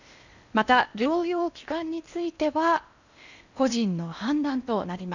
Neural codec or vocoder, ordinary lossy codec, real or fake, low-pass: codec, 16 kHz in and 24 kHz out, 0.8 kbps, FocalCodec, streaming, 65536 codes; none; fake; 7.2 kHz